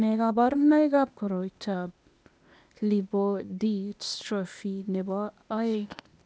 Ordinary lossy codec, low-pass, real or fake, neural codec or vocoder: none; none; fake; codec, 16 kHz, 0.8 kbps, ZipCodec